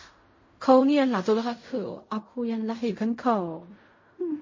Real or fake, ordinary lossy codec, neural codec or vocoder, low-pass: fake; MP3, 32 kbps; codec, 16 kHz in and 24 kHz out, 0.4 kbps, LongCat-Audio-Codec, fine tuned four codebook decoder; 7.2 kHz